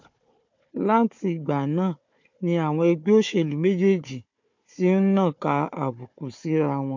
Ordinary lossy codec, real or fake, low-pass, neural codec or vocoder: MP3, 48 kbps; fake; 7.2 kHz; codec, 16 kHz, 4 kbps, FunCodec, trained on Chinese and English, 50 frames a second